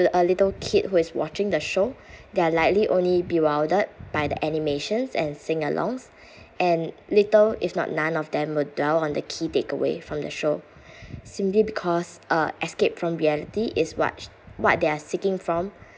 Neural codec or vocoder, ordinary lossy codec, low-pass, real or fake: none; none; none; real